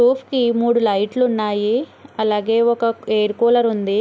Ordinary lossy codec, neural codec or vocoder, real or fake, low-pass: none; none; real; none